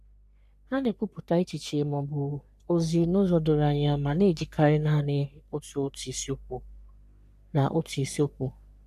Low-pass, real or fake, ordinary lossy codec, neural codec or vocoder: 14.4 kHz; fake; none; codec, 44.1 kHz, 3.4 kbps, Pupu-Codec